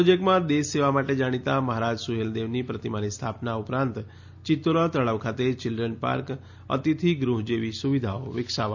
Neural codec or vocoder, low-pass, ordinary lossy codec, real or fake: none; 7.2 kHz; none; real